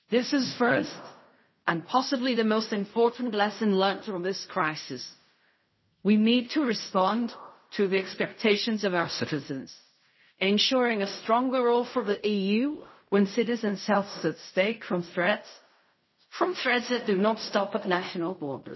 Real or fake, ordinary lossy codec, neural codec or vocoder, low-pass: fake; MP3, 24 kbps; codec, 16 kHz in and 24 kHz out, 0.4 kbps, LongCat-Audio-Codec, fine tuned four codebook decoder; 7.2 kHz